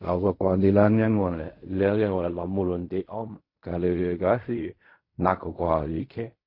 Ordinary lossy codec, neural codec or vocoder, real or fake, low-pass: MP3, 32 kbps; codec, 16 kHz in and 24 kHz out, 0.4 kbps, LongCat-Audio-Codec, fine tuned four codebook decoder; fake; 5.4 kHz